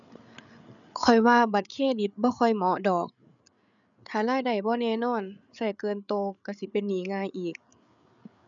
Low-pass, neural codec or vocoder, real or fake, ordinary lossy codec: 7.2 kHz; codec, 16 kHz, 8 kbps, FreqCodec, larger model; fake; none